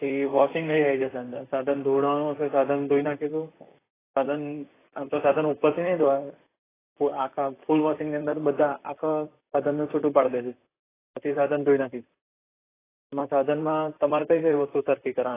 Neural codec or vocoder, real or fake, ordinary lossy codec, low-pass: vocoder, 44.1 kHz, 128 mel bands, Pupu-Vocoder; fake; AAC, 16 kbps; 3.6 kHz